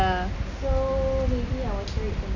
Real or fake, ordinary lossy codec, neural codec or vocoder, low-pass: real; none; none; 7.2 kHz